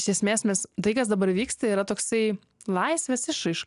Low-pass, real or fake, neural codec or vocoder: 10.8 kHz; real; none